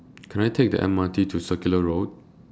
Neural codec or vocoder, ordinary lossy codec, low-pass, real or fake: none; none; none; real